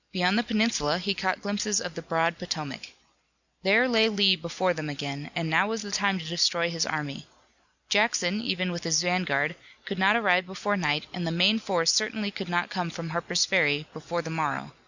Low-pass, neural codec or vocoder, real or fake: 7.2 kHz; none; real